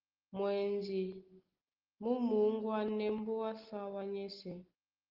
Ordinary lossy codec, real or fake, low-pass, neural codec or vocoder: Opus, 16 kbps; real; 5.4 kHz; none